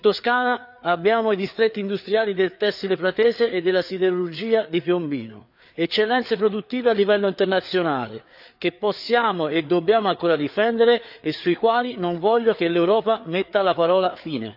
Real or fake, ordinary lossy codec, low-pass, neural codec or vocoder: fake; none; 5.4 kHz; codec, 16 kHz, 4 kbps, FreqCodec, larger model